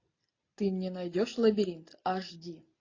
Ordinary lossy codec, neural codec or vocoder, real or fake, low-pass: AAC, 32 kbps; none; real; 7.2 kHz